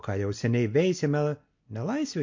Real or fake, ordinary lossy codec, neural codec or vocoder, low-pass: real; MP3, 48 kbps; none; 7.2 kHz